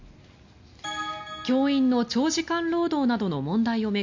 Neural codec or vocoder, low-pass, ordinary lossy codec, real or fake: none; 7.2 kHz; MP3, 64 kbps; real